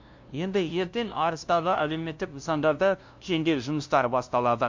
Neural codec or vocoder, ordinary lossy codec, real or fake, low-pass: codec, 16 kHz, 0.5 kbps, FunCodec, trained on LibriTTS, 25 frames a second; MP3, 48 kbps; fake; 7.2 kHz